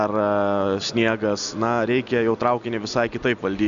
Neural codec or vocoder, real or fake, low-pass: none; real; 7.2 kHz